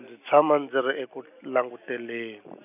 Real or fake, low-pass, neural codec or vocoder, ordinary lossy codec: real; 3.6 kHz; none; none